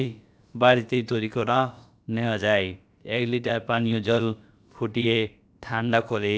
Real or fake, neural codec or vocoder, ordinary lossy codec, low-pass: fake; codec, 16 kHz, about 1 kbps, DyCAST, with the encoder's durations; none; none